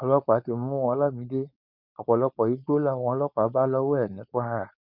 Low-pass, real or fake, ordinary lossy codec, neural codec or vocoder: 5.4 kHz; fake; none; codec, 16 kHz, 4.8 kbps, FACodec